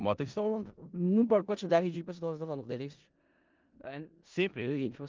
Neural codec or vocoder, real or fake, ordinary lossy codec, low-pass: codec, 16 kHz in and 24 kHz out, 0.4 kbps, LongCat-Audio-Codec, four codebook decoder; fake; Opus, 24 kbps; 7.2 kHz